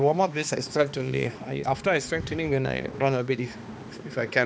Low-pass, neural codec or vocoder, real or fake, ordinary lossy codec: none; codec, 16 kHz, 2 kbps, X-Codec, HuBERT features, trained on balanced general audio; fake; none